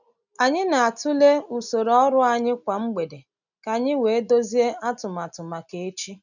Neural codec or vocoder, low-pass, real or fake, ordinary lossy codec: none; 7.2 kHz; real; none